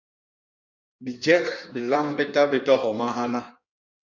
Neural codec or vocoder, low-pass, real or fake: codec, 16 kHz in and 24 kHz out, 1.1 kbps, FireRedTTS-2 codec; 7.2 kHz; fake